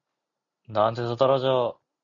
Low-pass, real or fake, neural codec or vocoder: 7.2 kHz; real; none